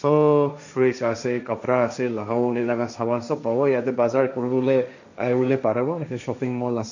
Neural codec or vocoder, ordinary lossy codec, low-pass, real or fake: codec, 16 kHz, 1.1 kbps, Voila-Tokenizer; none; 7.2 kHz; fake